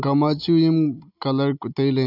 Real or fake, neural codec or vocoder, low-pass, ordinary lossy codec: real; none; 5.4 kHz; none